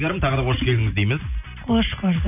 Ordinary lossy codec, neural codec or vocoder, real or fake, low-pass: none; none; real; 3.6 kHz